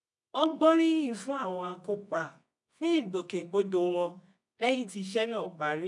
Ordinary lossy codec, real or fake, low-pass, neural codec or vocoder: none; fake; 10.8 kHz; codec, 24 kHz, 0.9 kbps, WavTokenizer, medium music audio release